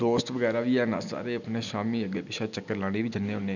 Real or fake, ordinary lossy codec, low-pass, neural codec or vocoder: real; none; 7.2 kHz; none